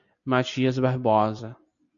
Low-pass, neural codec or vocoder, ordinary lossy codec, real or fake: 7.2 kHz; none; AAC, 64 kbps; real